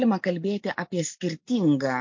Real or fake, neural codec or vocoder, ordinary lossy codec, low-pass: real; none; MP3, 48 kbps; 7.2 kHz